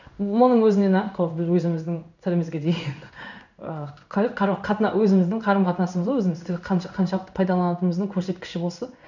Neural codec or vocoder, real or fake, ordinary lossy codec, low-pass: codec, 16 kHz in and 24 kHz out, 1 kbps, XY-Tokenizer; fake; none; 7.2 kHz